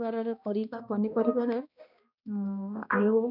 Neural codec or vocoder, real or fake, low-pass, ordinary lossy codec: codec, 16 kHz, 1 kbps, X-Codec, HuBERT features, trained on balanced general audio; fake; 5.4 kHz; none